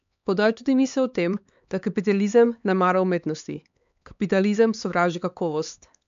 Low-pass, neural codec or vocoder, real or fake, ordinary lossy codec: 7.2 kHz; codec, 16 kHz, 4 kbps, X-Codec, HuBERT features, trained on LibriSpeech; fake; MP3, 64 kbps